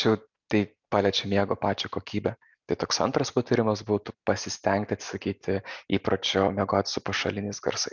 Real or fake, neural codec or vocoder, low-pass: real; none; 7.2 kHz